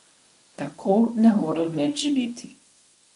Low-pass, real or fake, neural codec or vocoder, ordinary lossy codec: 10.8 kHz; fake; codec, 24 kHz, 0.9 kbps, WavTokenizer, medium speech release version 1; MP3, 64 kbps